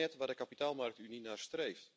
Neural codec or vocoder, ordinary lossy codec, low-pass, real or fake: none; none; none; real